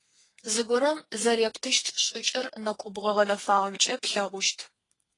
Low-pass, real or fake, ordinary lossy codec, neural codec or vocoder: 10.8 kHz; fake; AAC, 32 kbps; codec, 32 kHz, 1.9 kbps, SNAC